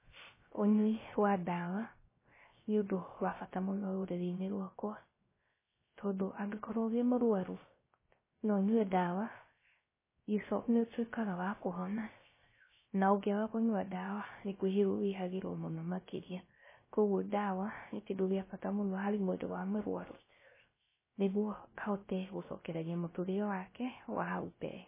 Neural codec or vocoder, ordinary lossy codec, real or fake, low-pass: codec, 16 kHz, 0.3 kbps, FocalCodec; MP3, 16 kbps; fake; 3.6 kHz